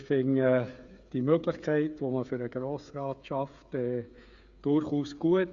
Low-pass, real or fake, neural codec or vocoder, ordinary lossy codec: 7.2 kHz; fake; codec, 16 kHz, 8 kbps, FreqCodec, smaller model; Opus, 64 kbps